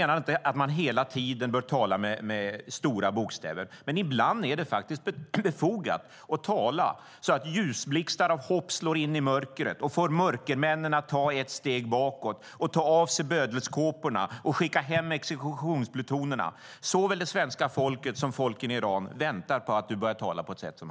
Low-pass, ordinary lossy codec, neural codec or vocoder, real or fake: none; none; none; real